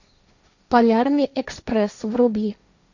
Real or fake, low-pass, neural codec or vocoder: fake; 7.2 kHz; codec, 16 kHz, 1.1 kbps, Voila-Tokenizer